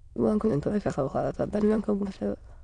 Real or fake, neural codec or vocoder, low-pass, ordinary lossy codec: fake; autoencoder, 22.05 kHz, a latent of 192 numbers a frame, VITS, trained on many speakers; 9.9 kHz; none